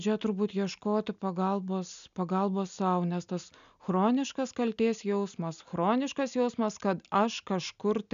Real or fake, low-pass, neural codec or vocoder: real; 7.2 kHz; none